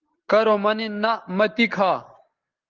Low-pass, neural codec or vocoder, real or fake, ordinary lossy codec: 7.2 kHz; none; real; Opus, 16 kbps